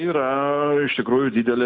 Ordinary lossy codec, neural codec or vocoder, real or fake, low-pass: Opus, 64 kbps; none; real; 7.2 kHz